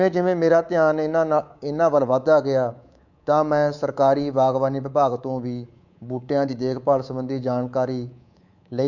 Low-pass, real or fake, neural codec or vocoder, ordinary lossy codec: 7.2 kHz; fake; codec, 24 kHz, 3.1 kbps, DualCodec; none